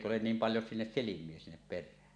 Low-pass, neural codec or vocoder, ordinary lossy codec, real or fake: 9.9 kHz; none; none; real